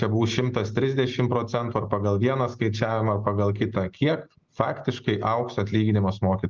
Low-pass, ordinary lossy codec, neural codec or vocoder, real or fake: 7.2 kHz; Opus, 32 kbps; none; real